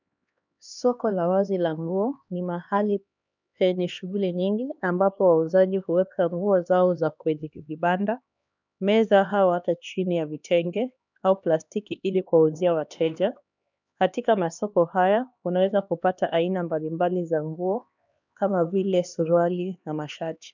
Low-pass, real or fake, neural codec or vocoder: 7.2 kHz; fake; codec, 16 kHz, 2 kbps, X-Codec, HuBERT features, trained on LibriSpeech